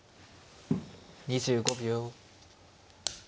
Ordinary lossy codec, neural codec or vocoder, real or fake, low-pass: none; none; real; none